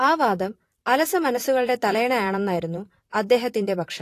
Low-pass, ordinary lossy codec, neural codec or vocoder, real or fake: 14.4 kHz; AAC, 48 kbps; vocoder, 44.1 kHz, 128 mel bands, Pupu-Vocoder; fake